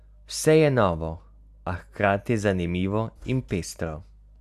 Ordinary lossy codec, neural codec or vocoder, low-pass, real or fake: none; none; 14.4 kHz; real